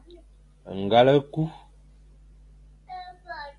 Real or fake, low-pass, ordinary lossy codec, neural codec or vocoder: real; 10.8 kHz; AAC, 48 kbps; none